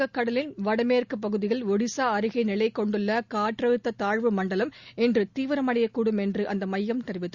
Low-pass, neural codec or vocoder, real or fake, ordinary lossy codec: 7.2 kHz; none; real; Opus, 64 kbps